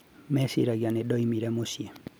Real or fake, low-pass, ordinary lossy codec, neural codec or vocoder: real; none; none; none